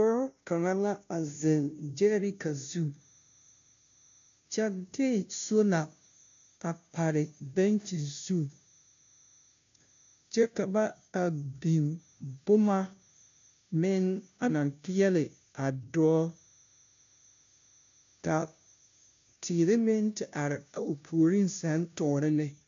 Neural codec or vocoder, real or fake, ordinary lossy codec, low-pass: codec, 16 kHz, 0.5 kbps, FunCodec, trained on Chinese and English, 25 frames a second; fake; MP3, 64 kbps; 7.2 kHz